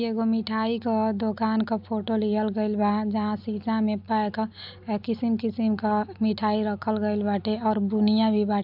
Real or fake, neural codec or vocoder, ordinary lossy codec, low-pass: real; none; AAC, 48 kbps; 5.4 kHz